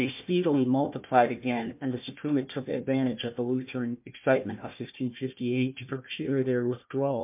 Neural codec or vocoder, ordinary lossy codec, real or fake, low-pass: codec, 16 kHz, 1 kbps, FunCodec, trained on Chinese and English, 50 frames a second; MP3, 32 kbps; fake; 3.6 kHz